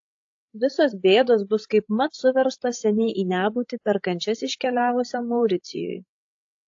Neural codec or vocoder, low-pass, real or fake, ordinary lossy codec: codec, 16 kHz, 16 kbps, FreqCodec, larger model; 7.2 kHz; fake; AAC, 48 kbps